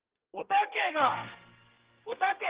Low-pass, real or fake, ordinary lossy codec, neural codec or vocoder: 3.6 kHz; fake; Opus, 16 kbps; codec, 32 kHz, 1.9 kbps, SNAC